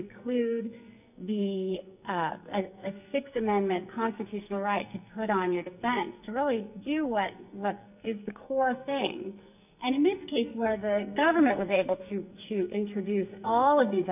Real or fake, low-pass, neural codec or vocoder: fake; 3.6 kHz; codec, 44.1 kHz, 2.6 kbps, SNAC